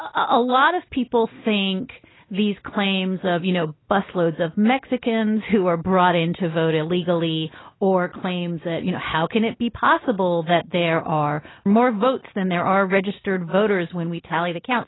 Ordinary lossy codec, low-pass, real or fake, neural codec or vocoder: AAC, 16 kbps; 7.2 kHz; real; none